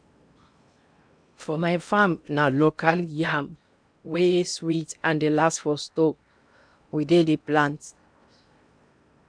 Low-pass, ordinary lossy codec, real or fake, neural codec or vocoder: 9.9 kHz; none; fake; codec, 16 kHz in and 24 kHz out, 0.8 kbps, FocalCodec, streaming, 65536 codes